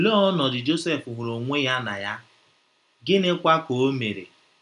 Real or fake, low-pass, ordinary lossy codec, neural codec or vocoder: real; 10.8 kHz; none; none